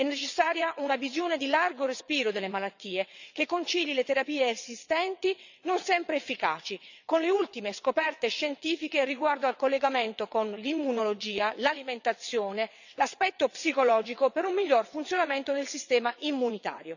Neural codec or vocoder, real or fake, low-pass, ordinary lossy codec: vocoder, 22.05 kHz, 80 mel bands, WaveNeXt; fake; 7.2 kHz; none